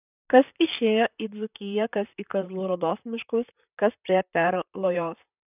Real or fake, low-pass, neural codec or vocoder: fake; 3.6 kHz; vocoder, 44.1 kHz, 128 mel bands, Pupu-Vocoder